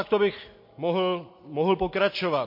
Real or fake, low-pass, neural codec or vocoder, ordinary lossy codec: real; 5.4 kHz; none; MP3, 32 kbps